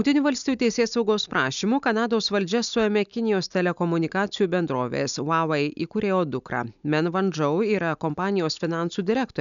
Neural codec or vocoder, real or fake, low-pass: none; real; 7.2 kHz